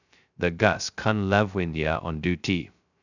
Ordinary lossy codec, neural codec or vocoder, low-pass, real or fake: none; codec, 16 kHz, 0.2 kbps, FocalCodec; 7.2 kHz; fake